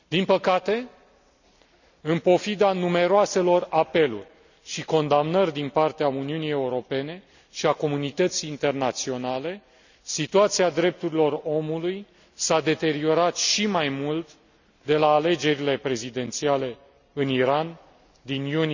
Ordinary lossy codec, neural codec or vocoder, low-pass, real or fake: none; none; 7.2 kHz; real